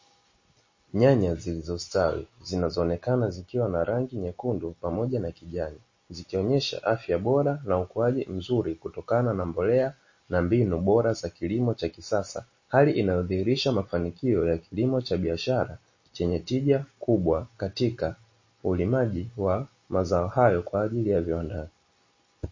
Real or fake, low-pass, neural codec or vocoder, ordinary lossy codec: real; 7.2 kHz; none; MP3, 32 kbps